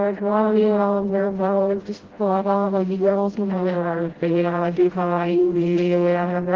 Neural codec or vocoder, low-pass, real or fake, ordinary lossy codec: codec, 16 kHz, 0.5 kbps, FreqCodec, smaller model; 7.2 kHz; fake; Opus, 16 kbps